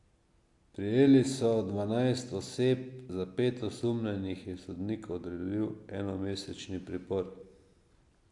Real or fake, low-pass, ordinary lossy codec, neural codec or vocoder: real; 10.8 kHz; AAC, 64 kbps; none